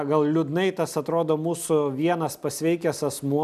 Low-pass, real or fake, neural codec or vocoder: 14.4 kHz; real; none